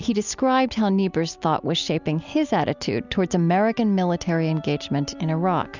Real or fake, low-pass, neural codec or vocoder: real; 7.2 kHz; none